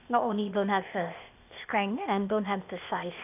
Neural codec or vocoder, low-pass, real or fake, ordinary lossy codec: codec, 16 kHz, 0.8 kbps, ZipCodec; 3.6 kHz; fake; none